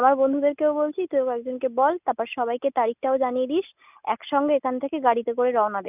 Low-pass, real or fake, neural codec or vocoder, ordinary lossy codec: 3.6 kHz; real; none; none